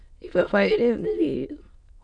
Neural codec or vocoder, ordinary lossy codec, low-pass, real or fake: autoencoder, 22.05 kHz, a latent of 192 numbers a frame, VITS, trained on many speakers; none; 9.9 kHz; fake